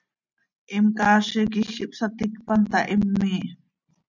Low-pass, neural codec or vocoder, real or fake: 7.2 kHz; none; real